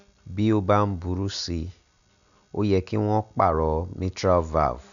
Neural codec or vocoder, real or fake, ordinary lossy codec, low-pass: none; real; none; 7.2 kHz